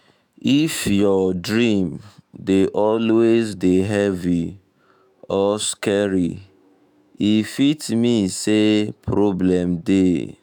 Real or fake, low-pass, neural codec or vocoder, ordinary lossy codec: fake; none; autoencoder, 48 kHz, 128 numbers a frame, DAC-VAE, trained on Japanese speech; none